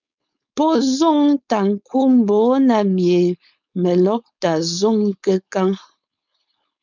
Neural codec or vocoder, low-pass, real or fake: codec, 16 kHz, 4.8 kbps, FACodec; 7.2 kHz; fake